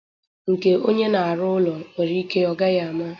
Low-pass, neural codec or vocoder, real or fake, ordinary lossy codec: 7.2 kHz; none; real; AAC, 32 kbps